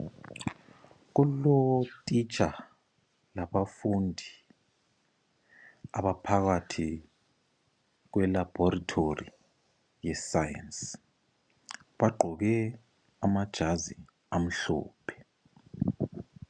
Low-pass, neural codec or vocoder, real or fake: 9.9 kHz; none; real